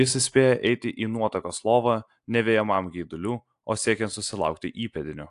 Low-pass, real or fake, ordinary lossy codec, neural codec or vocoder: 10.8 kHz; real; AAC, 64 kbps; none